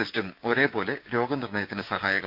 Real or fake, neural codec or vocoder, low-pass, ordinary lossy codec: fake; codec, 16 kHz, 6 kbps, DAC; 5.4 kHz; none